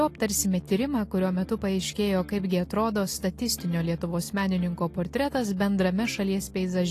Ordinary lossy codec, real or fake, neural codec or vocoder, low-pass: AAC, 48 kbps; real; none; 14.4 kHz